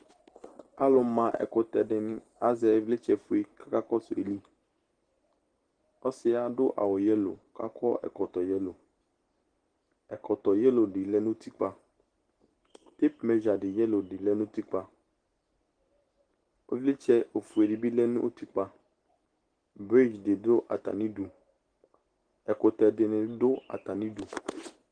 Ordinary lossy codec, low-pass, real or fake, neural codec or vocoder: Opus, 16 kbps; 9.9 kHz; real; none